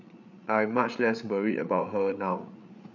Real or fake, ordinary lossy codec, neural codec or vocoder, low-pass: fake; none; codec, 16 kHz, 8 kbps, FreqCodec, larger model; 7.2 kHz